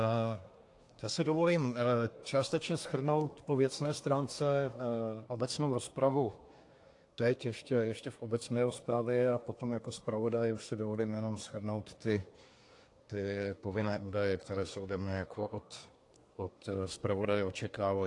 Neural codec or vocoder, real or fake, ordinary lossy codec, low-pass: codec, 24 kHz, 1 kbps, SNAC; fake; AAC, 48 kbps; 10.8 kHz